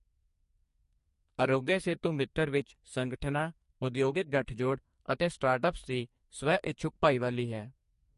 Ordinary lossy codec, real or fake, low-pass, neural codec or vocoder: MP3, 48 kbps; fake; 14.4 kHz; codec, 44.1 kHz, 2.6 kbps, SNAC